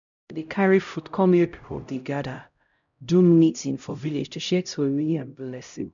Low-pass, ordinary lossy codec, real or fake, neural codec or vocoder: 7.2 kHz; none; fake; codec, 16 kHz, 0.5 kbps, X-Codec, HuBERT features, trained on LibriSpeech